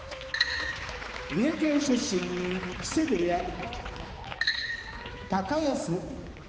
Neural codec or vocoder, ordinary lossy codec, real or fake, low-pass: codec, 16 kHz, 4 kbps, X-Codec, HuBERT features, trained on balanced general audio; none; fake; none